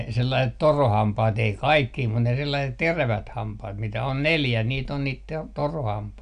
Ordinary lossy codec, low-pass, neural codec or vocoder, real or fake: none; 9.9 kHz; none; real